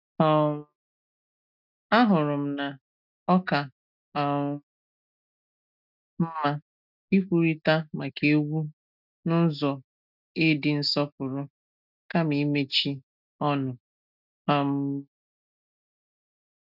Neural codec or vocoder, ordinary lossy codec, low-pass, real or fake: none; none; 5.4 kHz; real